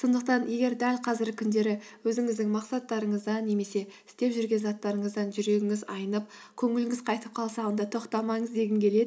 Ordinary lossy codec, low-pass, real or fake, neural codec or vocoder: none; none; real; none